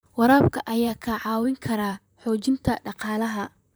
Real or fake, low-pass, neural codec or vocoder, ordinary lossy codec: fake; none; vocoder, 44.1 kHz, 128 mel bands, Pupu-Vocoder; none